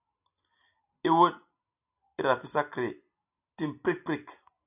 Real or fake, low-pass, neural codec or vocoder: real; 3.6 kHz; none